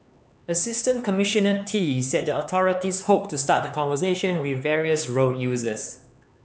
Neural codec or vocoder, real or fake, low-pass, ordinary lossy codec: codec, 16 kHz, 4 kbps, X-Codec, HuBERT features, trained on LibriSpeech; fake; none; none